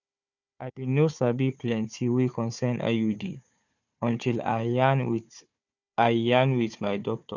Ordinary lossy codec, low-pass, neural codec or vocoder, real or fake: none; 7.2 kHz; codec, 16 kHz, 4 kbps, FunCodec, trained on Chinese and English, 50 frames a second; fake